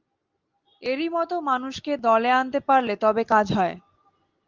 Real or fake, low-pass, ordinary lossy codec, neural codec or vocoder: real; 7.2 kHz; Opus, 24 kbps; none